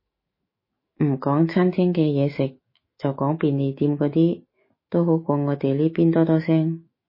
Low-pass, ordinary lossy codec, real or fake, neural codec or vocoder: 5.4 kHz; MP3, 24 kbps; fake; autoencoder, 48 kHz, 128 numbers a frame, DAC-VAE, trained on Japanese speech